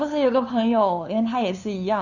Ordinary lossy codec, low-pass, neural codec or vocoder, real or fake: none; 7.2 kHz; codec, 16 kHz, 2 kbps, FunCodec, trained on LibriTTS, 25 frames a second; fake